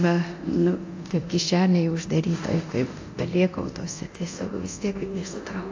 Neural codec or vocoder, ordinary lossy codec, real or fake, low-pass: codec, 24 kHz, 0.9 kbps, DualCodec; AAC, 48 kbps; fake; 7.2 kHz